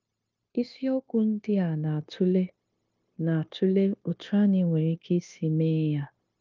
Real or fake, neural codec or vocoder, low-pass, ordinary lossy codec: fake; codec, 16 kHz, 0.9 kbps, LongCat-Audio-Codec; 7.2 kHz; Opus, 32 kbps